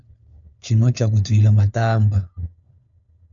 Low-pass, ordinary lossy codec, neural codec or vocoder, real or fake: 7.2 kHz; MP3, 96 kbps; codec, 16 kHz, 4 kbps, FunCodec, trained on LibriTTS, 50 frames a second; fake